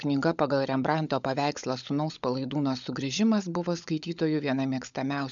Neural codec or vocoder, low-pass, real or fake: codec, 16 kHz, 16 kbps, FunCodec, trained on LibriTTS, 50 frames a second; 7.2 kHz; fake